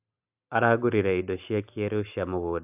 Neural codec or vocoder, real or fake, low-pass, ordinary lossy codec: none; real; 3.6 kHz; AAC, 32 kbps